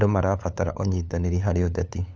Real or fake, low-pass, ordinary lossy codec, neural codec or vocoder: fake; none; none; codec, 16 kHz, 8 kbps, FunCodec, trained on LibriTTS, 25 frames a second